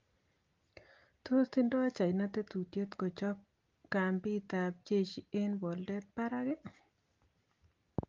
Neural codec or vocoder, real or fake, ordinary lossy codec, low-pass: none; real; Opus, 32 kbps; 7.2 kHz